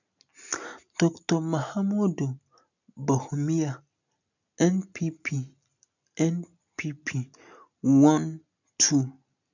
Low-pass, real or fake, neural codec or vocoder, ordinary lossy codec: 7.2 kHz; real; none; none